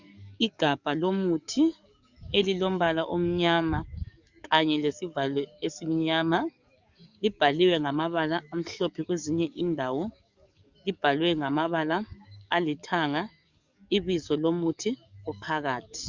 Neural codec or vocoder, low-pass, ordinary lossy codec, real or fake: codec, 44.1 kHz, 7.8 kbps, DAC; 7.2 kHz; Opus, 64 kbps; fake